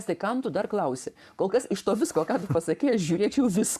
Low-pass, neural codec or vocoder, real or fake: 14.4 kHz; codec, 44.1 kHz, 7.8 kbps, Pupu-Codec; fake